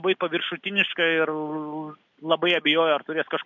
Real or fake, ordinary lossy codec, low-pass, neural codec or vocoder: real; MP3, 64 kbps; 7.2 kHz; none